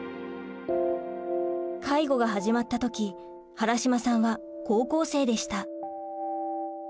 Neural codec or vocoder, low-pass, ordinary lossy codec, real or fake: none; none; none; real